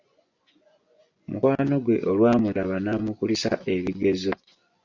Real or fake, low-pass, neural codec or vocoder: fake; 7.2 kHz; vocoder, 24 kHz, 100 mel bands, Vocos